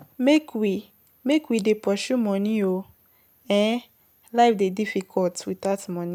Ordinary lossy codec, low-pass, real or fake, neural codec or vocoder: none; 19.8 kHz; real; none